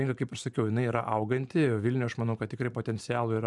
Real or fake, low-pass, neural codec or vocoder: fake; 10.8 kHz; vocoder, 44.1 kHz, 128 mel bands every 512 samples, BigVGAN v2